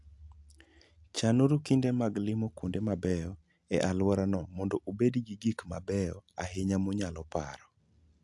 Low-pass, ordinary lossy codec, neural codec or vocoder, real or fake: 10.8 kHz; AAC, 64 kbps; none; real